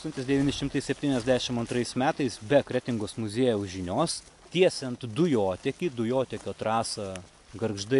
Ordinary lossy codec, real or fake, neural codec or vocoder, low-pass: AAC, 64 kbps; real; none; 10.8 kHz